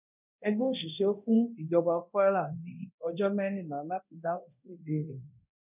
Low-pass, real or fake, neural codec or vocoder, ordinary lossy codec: 3.6 kHz; fake; codec, 24 kHz, 0.9 kbps, DualCodec; none